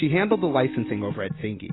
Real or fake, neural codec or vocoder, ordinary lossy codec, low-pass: real; none; AAC, 16 kbps; 7.2 kHz